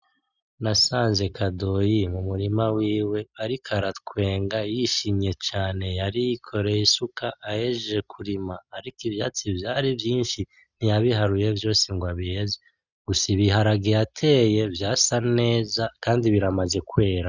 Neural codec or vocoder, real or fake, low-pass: none; real; 7.2 kHz